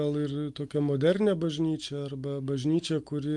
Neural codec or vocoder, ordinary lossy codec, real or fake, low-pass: none; Opus, 32 kbps; real; 10.8 kHz